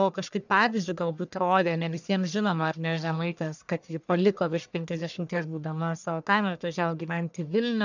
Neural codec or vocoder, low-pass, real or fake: codec, 44.1 kHz, 1.7 kbps, Pupu-Codec; 7.2 kHz; fake